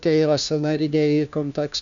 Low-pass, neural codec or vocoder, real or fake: 7.2 kHz; codec, 16 kHz, 1 kbps, FunCodec, trained on LibriTTS, 50 frames a second; fake